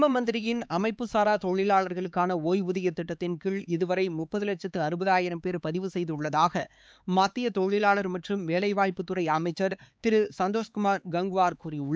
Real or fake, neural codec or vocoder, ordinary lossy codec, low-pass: fake; codec, 16 kHz, 4 kbps, X-Codec, HuBERT features, trained on LibriSpeech; none; none